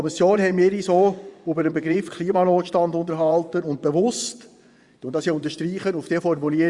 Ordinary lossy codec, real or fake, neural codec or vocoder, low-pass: Opus, 64 kbps; fake; vocoder, 24 kHz, 100 mel bands, Vocos; 10.8 kHz